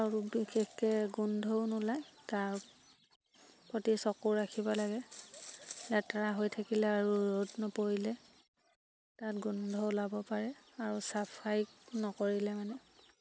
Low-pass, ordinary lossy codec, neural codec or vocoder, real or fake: none; none; none; real